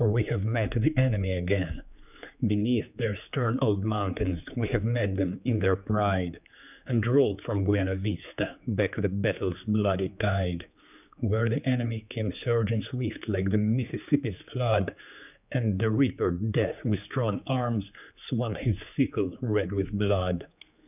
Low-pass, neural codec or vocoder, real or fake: 3.6 kHz; codec, 16 kHz, 4 kbps, X-Codec, HuBERT features, trained on general audio; fake